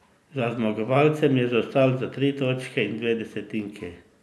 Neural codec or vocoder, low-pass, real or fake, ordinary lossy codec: none; none; real; none